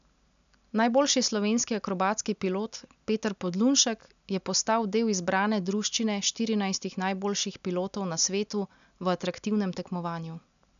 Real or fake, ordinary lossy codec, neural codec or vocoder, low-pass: real; none; none; 7.2 kHz